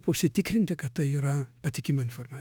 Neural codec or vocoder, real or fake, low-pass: autoencoder, 48 kHz, 32 numbers a frame, DAC-VAE, trained on Japanese speech; fake; 19.8 kHz